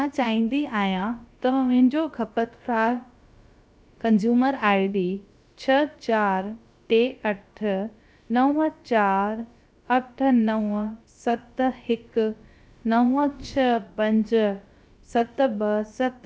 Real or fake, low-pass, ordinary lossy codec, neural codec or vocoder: fake; none; none; codec, 16 kHz, about 1 kbps, DyCAST, with the encoder's durations